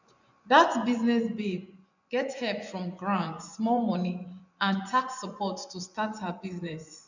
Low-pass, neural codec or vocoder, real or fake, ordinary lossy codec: 7.2 kHz; vocoder, 24 kHz, 100 mel bands, Vocos; fake; none